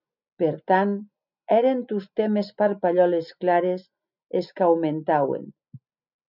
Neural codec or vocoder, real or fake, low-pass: none; real; 5.4 kHz